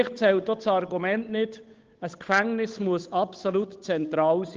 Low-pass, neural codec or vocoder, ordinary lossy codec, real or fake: 7.2 kHz; codec, 16 kHz, 8 kbps, FunCodec, trained on Chinese and English, 25 frames a second; Opus, 24 kbps; fake